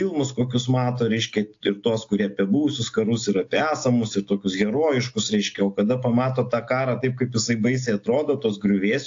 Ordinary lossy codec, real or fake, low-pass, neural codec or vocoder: AAC, 48 kbps; real; 7.2 kHz; none